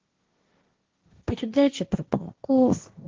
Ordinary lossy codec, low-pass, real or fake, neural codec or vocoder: Opus, 16 kbps; 7.2 kHz; fake; codec, 16 kHz, 1.1 kbps, Voila-Tokenizer